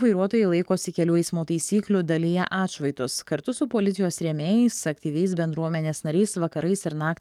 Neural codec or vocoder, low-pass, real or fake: codec, 44.1 kHz, 7.8 kbps, DAC; 19.8 kHz; fake